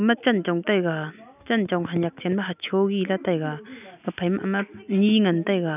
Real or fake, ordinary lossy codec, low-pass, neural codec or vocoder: real; none; 3.6 kHz; none